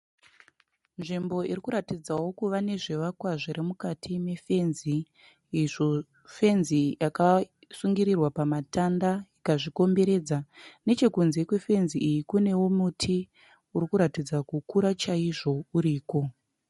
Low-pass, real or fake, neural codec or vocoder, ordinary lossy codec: 19.8 kHz; real; none; MP3, 48 kbps